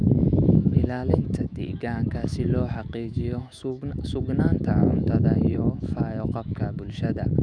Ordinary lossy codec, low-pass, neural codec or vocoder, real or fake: none; 9.9 kHz; autoencoder, 48 kHz, 128 numbers a frame, DAC-VAE, trained on Japanese speech; fake